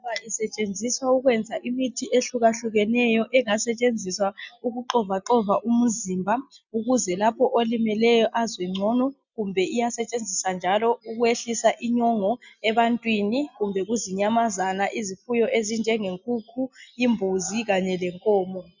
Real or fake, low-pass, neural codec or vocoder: real; 7.2 kHz; none